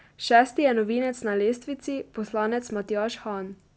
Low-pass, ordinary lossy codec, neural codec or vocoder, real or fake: none; none; none; real